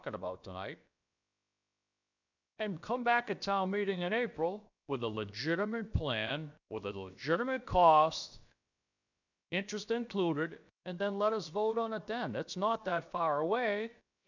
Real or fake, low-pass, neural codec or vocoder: fake; 7.2 kHz; codec, 16 kHz, about 1 kbps, DyCAST, with the encoder's durations